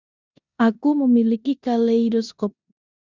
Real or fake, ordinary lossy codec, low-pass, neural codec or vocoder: fake; Opus, 64 kbps; 7.2 kHz; codec, 16 kHz in and 24 kHz out, 0.9 kbps, LongCat-Audio-Codec, four codebook decoder